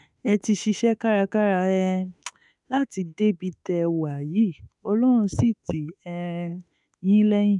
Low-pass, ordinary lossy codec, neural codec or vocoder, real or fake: 10.8 kHz; none; autoencoder, 48 kHz, 32 numbers a frame, DAC-VAE, trained on Japanese speech; fake